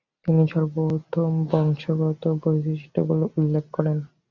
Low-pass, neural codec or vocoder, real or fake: 7.2 kHz; none; real